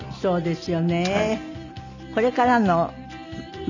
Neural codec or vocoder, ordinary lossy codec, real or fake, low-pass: none; none; real; 7.2 kHz